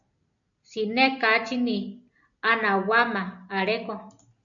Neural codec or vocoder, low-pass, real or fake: none; 7.2 kHz; real